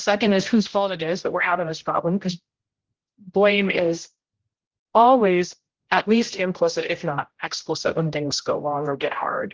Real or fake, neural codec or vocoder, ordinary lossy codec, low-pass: fake; codec, 16 kHz, 0.5 kbps, X-Codec, HuBERT features, trained on general audio; Opus, 16 kbps; 7.2 kHz